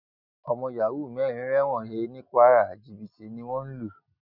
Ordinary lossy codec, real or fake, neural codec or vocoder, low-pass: none; real; none; 5.4 kHz